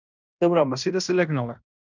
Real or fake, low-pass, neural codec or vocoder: fake; 7.2 kHz; codec, 16 kHz in and 24 kHz out, 0.9 kbps, LongCat-Audio-Codec, fine tuned four codebook decoder